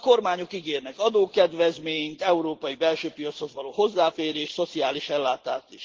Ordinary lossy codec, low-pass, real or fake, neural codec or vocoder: Opus, 16 kbps; 7.2 kHz; real; none